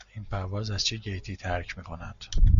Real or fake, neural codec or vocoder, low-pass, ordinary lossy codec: real; none; 7.2 kHz; AAC, 96 kbps